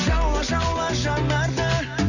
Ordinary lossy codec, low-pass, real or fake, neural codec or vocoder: none; 7.2 kHz; real; none